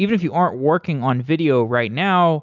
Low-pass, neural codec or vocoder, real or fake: 7.2 kHz; none; real